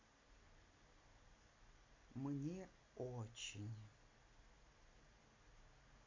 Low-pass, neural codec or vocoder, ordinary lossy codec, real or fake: 7.2 kHz; none; MP3, 48 kbps; real